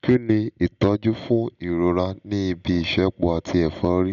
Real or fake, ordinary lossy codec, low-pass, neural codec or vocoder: real; none; 7.2 kHz; none